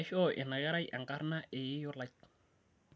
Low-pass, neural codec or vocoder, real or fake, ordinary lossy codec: none; none; real; none